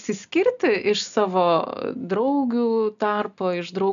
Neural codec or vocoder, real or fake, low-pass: none; real; 7.2 kHz